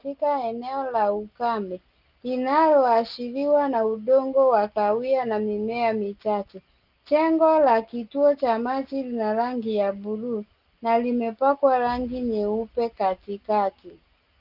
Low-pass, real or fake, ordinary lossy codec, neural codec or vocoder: 5.4 kHz; real; Opus, 24 kbps; none